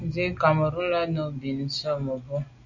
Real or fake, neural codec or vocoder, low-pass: real; none; 7.2 kHz